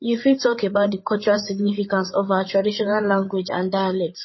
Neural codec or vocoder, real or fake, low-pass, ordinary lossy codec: codec, 16 kHz, 8 kbps, FreqCodec, larger model; fake; 7.2 kHz; MP3, 24 kbps